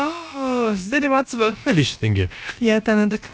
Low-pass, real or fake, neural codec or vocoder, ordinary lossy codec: none; fake; codec, 16 kHz, about 1 kbps, DyCAST, with the encoder's durations; none